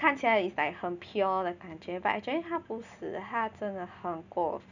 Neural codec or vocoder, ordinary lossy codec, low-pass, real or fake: none; none; 7.2 kHz; real